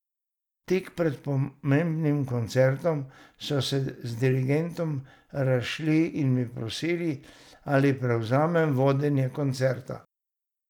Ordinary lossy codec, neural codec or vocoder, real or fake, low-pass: none; none; real; 19.8 kHz